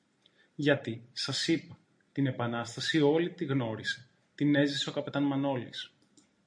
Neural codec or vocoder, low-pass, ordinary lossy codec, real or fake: none; 9.9 kHz; MP3, 48 kbps; real